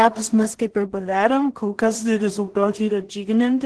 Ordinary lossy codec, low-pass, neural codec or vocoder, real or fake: Opus, 16 kbps; 10.8 kHz; codec, 16 kHz in and 24 kHz out, 0.4 kbps, LongCat-Audio-Codec, two codebook decoder; fake